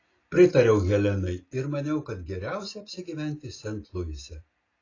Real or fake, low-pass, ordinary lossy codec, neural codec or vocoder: real; 7.2 kHz; AAC, 32 kbps; none